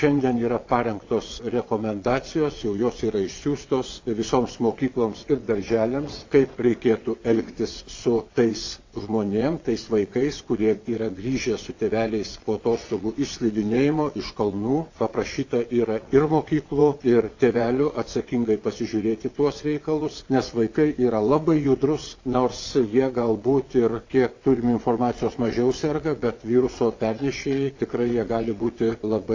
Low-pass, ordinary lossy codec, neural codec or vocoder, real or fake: 7.2 kHz; AAC, 48 kbps; vocoder, 22.05 kHz, 80 mel bands, WaveNeXt; fake